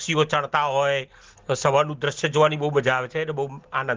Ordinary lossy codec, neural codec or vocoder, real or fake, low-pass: Opus, 16 kbps; none; real; 7.2 kHz